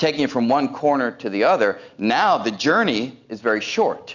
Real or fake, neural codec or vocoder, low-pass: real; none; 7.2 kHz